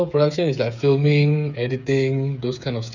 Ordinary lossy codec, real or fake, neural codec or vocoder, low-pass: none; fake; codec, 16 kHz, 8 kbps, FreqCodec, smaller model; 7.2 kHz